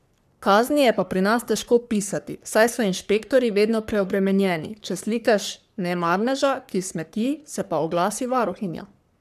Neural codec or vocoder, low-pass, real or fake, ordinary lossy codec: codec, 44.1 kHz, 3.4 kbps, Pupu-Codec; 14.4 kHz; fake; none